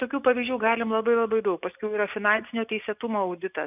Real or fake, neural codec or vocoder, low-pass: real; none; 3.6 kHz